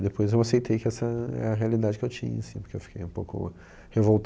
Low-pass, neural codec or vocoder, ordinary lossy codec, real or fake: none; none; none; real